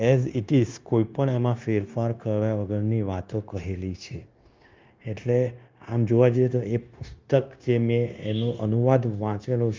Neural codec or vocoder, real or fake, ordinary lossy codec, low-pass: codec, 16 kHz, 0.9 kbps, LongCat-Audio-Codec; fake; Opus, 24 kbps; 7.2 kHz